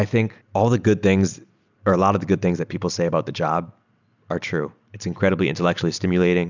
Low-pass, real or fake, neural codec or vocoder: 7.2 kHz; real; none